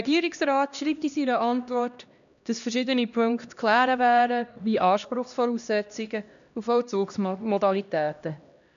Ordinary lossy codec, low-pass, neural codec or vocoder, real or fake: none; 7.2 kHz; codec, 16 kHz, 1 kbps, X-Codec, HuBERT features, trained on LibriSpeech; fake